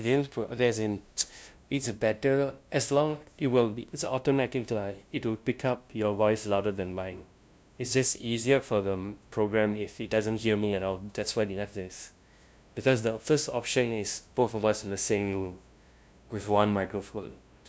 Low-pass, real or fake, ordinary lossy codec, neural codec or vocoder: none; fake; none; codec, 16 kHz, 0.5 kbps, FunCodec, trained on LibriTTS, 25 frames a second